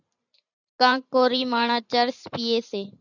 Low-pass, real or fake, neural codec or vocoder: 7.2 kHz; fake; vocoder, 44.1 kHz, 128 mel bands every 512 samples, BigVGAN v2